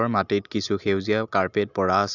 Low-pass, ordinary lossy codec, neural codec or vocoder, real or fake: 7.2 kHz; none; none; real